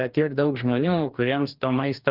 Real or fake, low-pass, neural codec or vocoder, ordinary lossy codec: fake; 5.4 kHz; codec, 44.1 kHz, 2.6 kbps, DAC; Opus, 24 kbps